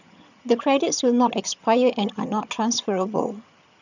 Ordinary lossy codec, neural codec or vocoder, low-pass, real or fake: none; vocoder, 22.05 kHz, 80 mel bands, HiFi-GAN; 7.2 kHz; fake